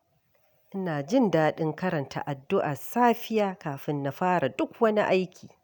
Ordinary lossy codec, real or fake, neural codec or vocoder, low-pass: none; real; none; none